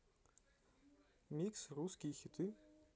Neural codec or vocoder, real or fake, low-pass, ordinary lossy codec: none; real; none; none